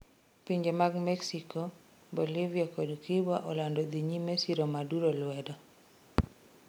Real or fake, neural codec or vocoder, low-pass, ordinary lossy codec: real; none; none; none